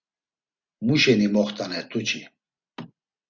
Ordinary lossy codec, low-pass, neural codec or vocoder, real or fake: Opus, 64 kbps; 7.2 kHz; none; real